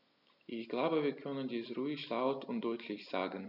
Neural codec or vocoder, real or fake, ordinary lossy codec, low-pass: none; real; none; 5.4 kHz